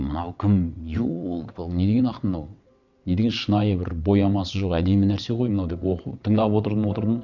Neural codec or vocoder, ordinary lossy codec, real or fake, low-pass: vocoder, 22.05 kHz, 80 mel bands, WaveNeXt; none; fake; 7.2 kHz